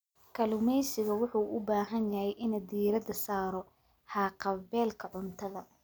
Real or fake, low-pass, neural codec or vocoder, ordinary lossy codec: real; none; none; none